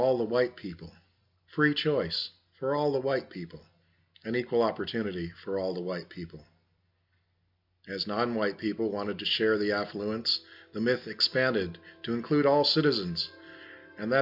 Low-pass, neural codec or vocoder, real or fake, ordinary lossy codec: 5.4 kHz; none; real; MP3, 48 kbps